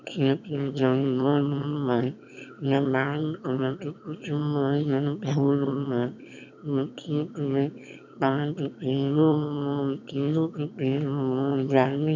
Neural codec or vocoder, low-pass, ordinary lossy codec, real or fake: autoencoder, 22.05 kHz, a latent of 192 numbers a frame, VITS, trained on one speaker; 7.2 kHz; none; fake